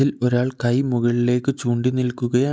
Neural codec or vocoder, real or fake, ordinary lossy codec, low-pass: none; real; none; none